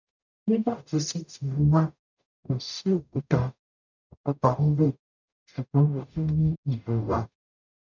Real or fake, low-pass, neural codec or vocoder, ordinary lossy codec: fake; 7.2 kHz; codec, 44.1 kHz, 0.9 kbps, DAC; none